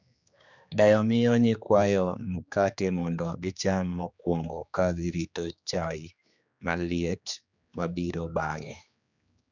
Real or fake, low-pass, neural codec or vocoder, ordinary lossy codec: fake; 7.2 kHz; codec, 16 kHz, 2 kbps, X-Codec, HuBERT features, trained on general audio; none